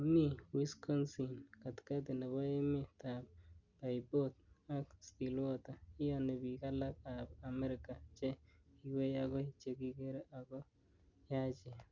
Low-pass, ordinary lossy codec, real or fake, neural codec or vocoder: 7.2 kHz; Opus, 64 kbps; real; none